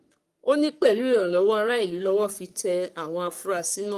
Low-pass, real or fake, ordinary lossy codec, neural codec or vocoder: 14.4 kHz; fake; Opus, 32 kbps; codec, 44.1 kHz, 3.4 kbps, Pupu-Codec